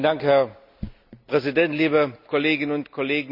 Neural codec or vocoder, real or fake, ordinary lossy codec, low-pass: none; real; none; 5.4 kHz